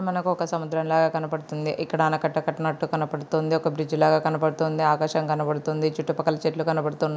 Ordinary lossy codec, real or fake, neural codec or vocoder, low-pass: none; real; none; none